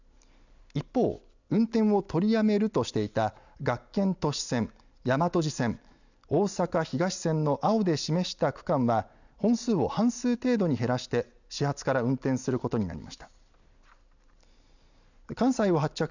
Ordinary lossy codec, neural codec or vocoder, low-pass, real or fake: none; none; 7.2 kHz; real